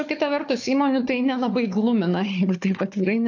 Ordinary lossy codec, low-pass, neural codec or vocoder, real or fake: AAC, 48 kbps; 7.2 kHz; codec, 16 kHz, 4 kbps, FunCodec, trained on Chinese and English, 50 frames a second; fake